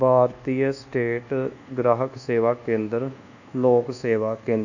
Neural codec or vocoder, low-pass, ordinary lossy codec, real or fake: codec, 24 kHz, 1.2 kbps, DualCodec; 7.2 kHz; none; fake